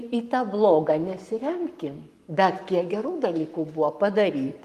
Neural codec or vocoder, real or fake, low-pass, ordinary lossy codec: codec, 44.1 kHz, 7.8 kbps, Pupu-Codec; fake; 14.4 kHz; Opus, 32 kbps